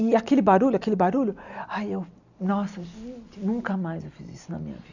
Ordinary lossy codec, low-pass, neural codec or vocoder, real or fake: none; 7.2 kHz; none; real